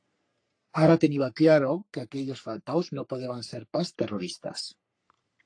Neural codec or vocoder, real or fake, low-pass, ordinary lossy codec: codec, 44.1 kHz, 3.4 kbps, Pupu-Codec; fake; 9.9 kHz; AAC, 48 kbps